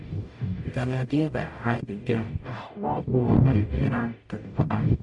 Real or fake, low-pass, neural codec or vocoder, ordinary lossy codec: fake; 10.8 kHz; codec, 44.1 kHz, 0.9 kbps, DAC; MP3, 48 kbps